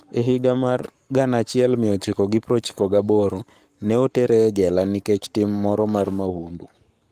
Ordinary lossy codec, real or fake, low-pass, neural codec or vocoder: Opus, 32 kbps; fake; 14.4 kHz; codec, 44.1 kHz, 7.8 kbps, Pupu-Codec